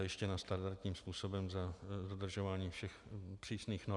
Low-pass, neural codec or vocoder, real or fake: 10.8 kHz; none; real